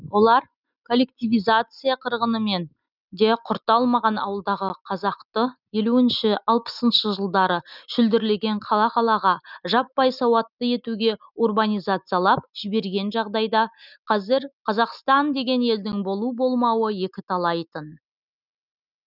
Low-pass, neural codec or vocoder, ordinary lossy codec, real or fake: 5.4 kHz; none; none; real